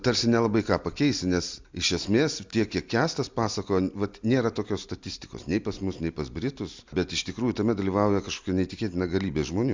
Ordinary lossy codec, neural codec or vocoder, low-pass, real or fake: MP3, 64 kbps; none; 7.2 kHz; real